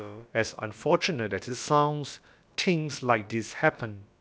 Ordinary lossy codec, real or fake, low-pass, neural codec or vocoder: none; fake; none; codec, 16 kHz, about 1 kbps, DyCAST, with the encoder's durations